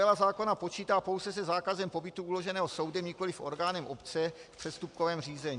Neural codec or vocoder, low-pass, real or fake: none; 10.8 kHz; real